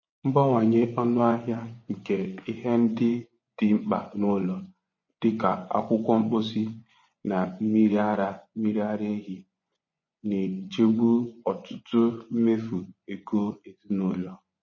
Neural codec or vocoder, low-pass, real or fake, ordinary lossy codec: vocoder, 24 kHz, 100 mel bands, Vocos; 7.2 kHz; fake; MP3, 32 kbps